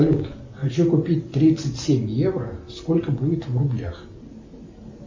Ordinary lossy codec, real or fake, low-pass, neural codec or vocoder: MP3, 32 kbps; real; 7.2 kHz; none